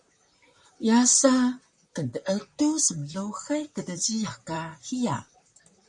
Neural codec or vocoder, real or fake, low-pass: vocoder, 44.1 kHz, 128 mel bands, Pupu-Vocoder; fake; 10.8 kHz